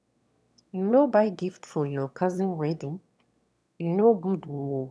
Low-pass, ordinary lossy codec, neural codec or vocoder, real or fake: none; none; autoencoder, 22.05 kHz, a latent of 192 numbers a frame, VITS, trained on one speaker; fake